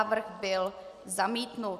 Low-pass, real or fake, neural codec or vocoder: 14.4 kHz; real; none